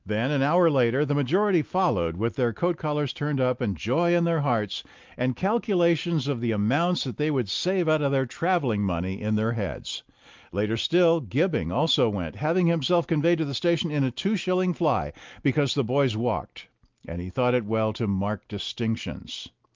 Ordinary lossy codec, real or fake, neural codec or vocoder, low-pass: Opus, 24 kbps; real; none; 7.2 kHz